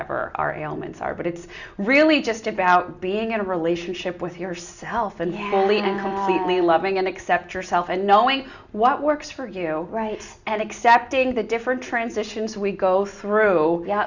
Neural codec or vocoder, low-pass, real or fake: none; 7.2 kHz; real